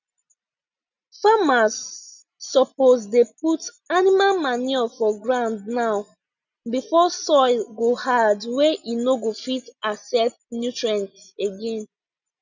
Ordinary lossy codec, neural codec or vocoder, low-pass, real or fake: none; none; 7.2 kHz; real